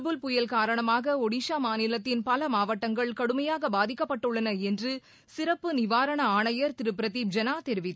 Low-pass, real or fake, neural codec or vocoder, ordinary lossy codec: none; real; none; none